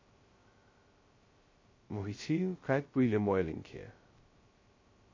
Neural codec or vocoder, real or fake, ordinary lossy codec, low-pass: codec, 16 kHz, 0.2 kbps, FocalCodec; fake; MP3, 32 kbps; 7.2 kHz